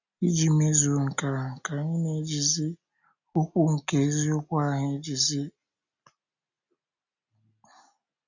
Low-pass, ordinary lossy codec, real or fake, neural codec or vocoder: 7.2 kHz; none; real; none